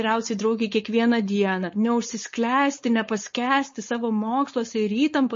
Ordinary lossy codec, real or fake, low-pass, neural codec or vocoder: MP3, 32 kbps; fake; 7.2 kHz; codec, 16 kHz, 4.8 kbps, FACodec